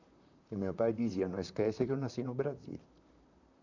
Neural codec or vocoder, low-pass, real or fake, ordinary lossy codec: vocoder, 44.1 kHz, 128 mel bands, Pupu-Vocoder; 7.2 kHz; fake; none